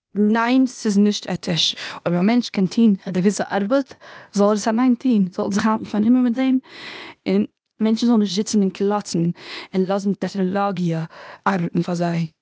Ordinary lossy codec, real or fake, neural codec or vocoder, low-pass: none; fake; codec, 16 kHz, 0.8 kbps, ZipCodec; none